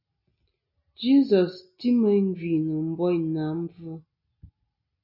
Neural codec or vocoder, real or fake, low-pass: none; real; 5.4 kHz